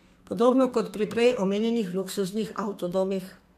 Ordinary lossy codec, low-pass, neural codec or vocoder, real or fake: MP3, 96 kbps; 14.4 kHz; codec, 32 kHz, 1.9 kbps, SNAC; fake